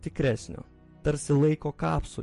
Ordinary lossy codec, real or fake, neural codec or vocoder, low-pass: MP3, 48 kbps; real; none; 14.4 kHz